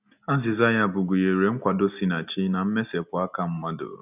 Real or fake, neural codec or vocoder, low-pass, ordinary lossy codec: real; none; 3.6 kHz; none